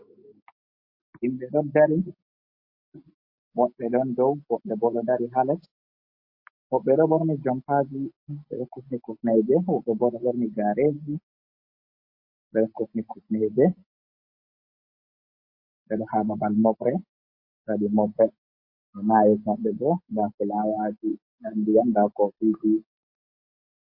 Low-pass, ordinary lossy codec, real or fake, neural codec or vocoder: 5.4 kHz; MP3, 32 kbps; real; none